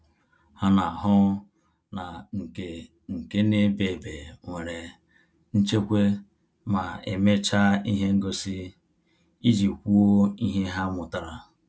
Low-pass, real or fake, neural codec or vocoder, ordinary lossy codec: none; real; none; none